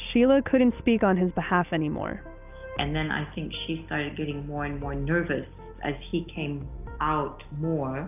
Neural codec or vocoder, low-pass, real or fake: none; 3.6 kHz; real